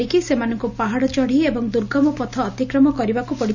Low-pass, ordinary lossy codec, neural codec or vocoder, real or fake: 7.2 kHz; none; none; real